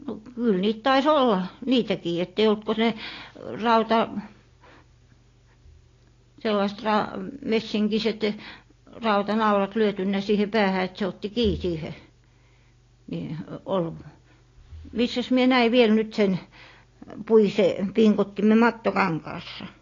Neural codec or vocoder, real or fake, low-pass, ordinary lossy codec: none; real; 7.2 kHz; AAC, 32 kbps